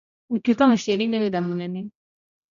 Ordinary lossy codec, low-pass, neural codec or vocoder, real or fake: none; 7.2 kHz; codec, 16 kHz, 0.5 kbps, X-Codec, HuBERT features, trained on general audio; fake